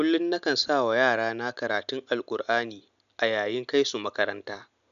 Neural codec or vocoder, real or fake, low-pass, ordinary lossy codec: none; real; 7.2 kHz; none